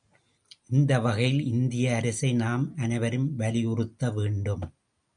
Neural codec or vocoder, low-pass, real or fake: none; 9.9 kHz; real